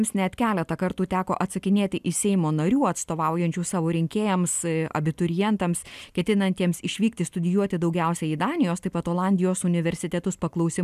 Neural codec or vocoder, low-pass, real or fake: none; 14.4 kHz; real